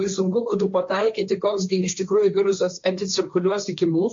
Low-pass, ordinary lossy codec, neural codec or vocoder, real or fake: 7.2 kHz; MP3, 48 kbps; codec, 16 kHz, 1.1 kbps, Voila-Tokenizer; fake